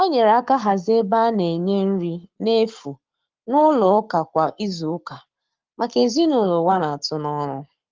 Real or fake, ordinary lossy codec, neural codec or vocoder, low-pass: fake; Opus, 32 kbps; codec, 44.1 kHz, 7.8 kbps, Pupu-Codec; 7.2 kHz